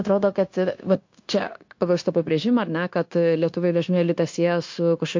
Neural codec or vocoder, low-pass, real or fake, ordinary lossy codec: codec, 16 kHz, 0.9 kbps, LongCat-Audio-Codec; 7.2 kHz; fake; MP3, 48 kbps